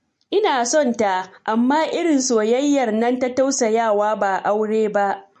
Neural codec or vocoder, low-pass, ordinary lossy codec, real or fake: none; 14.4 kHz; MP3, 48 kbps; real